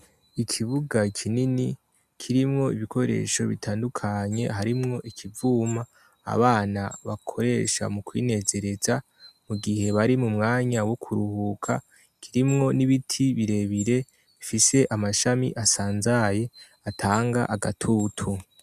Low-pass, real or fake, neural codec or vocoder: 14.4 kHz; real; none